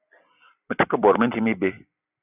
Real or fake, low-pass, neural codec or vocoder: fake; 3.6 kHz; vocoder, 44.1 kHz, 128 mel bands every 256 samples, BigVGAN v2